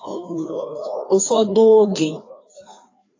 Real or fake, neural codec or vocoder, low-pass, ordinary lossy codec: fake; codec, 16 kHz, 2 kbps, FreqCodec, larger model; 7.2 kHz; AAC, 32 kbps